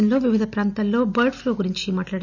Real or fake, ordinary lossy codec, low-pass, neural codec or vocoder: real; none; 7.2 kHz; none